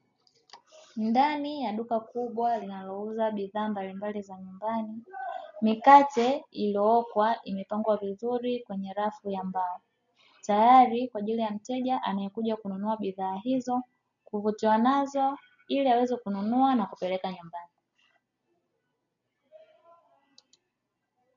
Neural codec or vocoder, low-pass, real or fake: none; 7.2 kHz; real